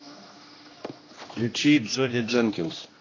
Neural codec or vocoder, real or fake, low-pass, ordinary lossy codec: codec, 16 kHz, 1 kbps, X-Codec, HuBERT features, trained on general audio; fake; 7.2 kHz; AAC, 32 kbps